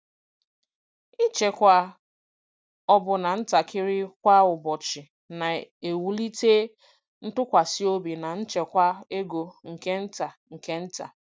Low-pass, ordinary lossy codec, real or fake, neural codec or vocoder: none; none; real; none